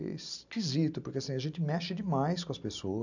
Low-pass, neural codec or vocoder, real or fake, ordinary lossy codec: 7.2 kHz; none; real; none